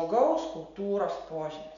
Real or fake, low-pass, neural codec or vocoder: real; 7.2 kHz; none